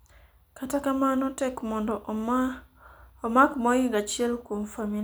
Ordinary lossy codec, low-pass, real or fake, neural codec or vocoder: none; none; real; none